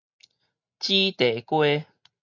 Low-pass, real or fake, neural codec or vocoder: 7.2 kHz; real; none